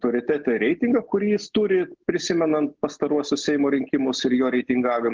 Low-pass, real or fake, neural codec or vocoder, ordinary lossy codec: 7.2 kHz; real; none; Opus, 16 kbps